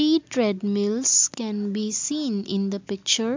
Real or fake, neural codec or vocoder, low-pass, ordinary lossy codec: real; none; 7.2 kHz; MP3, 64 kbps